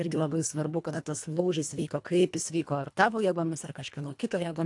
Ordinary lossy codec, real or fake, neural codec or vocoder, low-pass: AAC, 64 kbps; fake; codec, 24 kHz, 1.5 kbps, HILCodec; 10.8 kHz